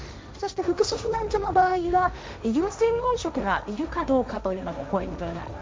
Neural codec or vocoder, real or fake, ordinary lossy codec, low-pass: codec, 16 kHz, 1.1 kbps, Voila-Tokenizer; fake; none; none